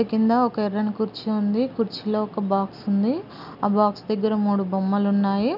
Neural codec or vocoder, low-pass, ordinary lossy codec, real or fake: none; 5.4 kHz; none; real